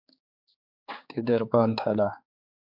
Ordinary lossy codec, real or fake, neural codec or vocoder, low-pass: MP3, 48 kbps; fake; codec, 16 kHz, 4 kbps, X-Codec, HuBERT features, trained on general audio; 5.4 kHz